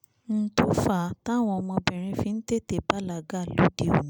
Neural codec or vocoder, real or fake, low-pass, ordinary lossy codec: none; real; none; none